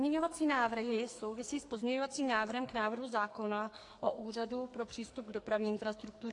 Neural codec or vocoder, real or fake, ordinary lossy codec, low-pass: codec, 44.1 kHz, 2.6 kbps, SNAC; fake; AAC, 48 kbps; 10.8 kHz